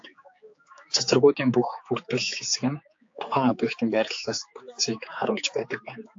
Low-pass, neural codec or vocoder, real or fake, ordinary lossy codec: 7.2 kHz; codec, 16 kHz, 4 kbps, X-Codec, HuBERT features, trained on general audio; fake; AAC, 48 kbps